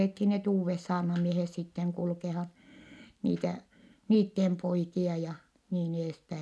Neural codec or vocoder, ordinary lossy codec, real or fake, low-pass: none; none; real; none